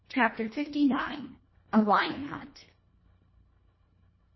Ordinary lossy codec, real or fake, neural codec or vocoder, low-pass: MP3, 24 kbps; fake; codec, 24 kHz, 1.5 kbps, HILCodec; 7.2 kHz